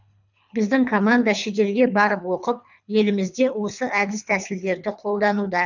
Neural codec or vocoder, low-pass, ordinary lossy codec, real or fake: codec, 24 kHz, 3 kbps, HILCodec; 7.2 kHz; none; fake